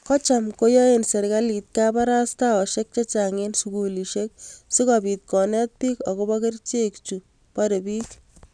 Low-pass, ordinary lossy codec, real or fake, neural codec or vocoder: 9.9 kHz; none; real; none